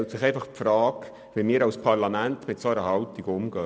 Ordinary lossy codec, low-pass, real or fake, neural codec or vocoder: none; none; real; none